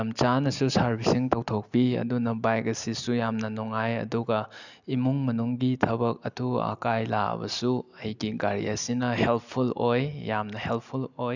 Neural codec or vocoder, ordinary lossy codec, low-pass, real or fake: none; none; 7.2 kHz; real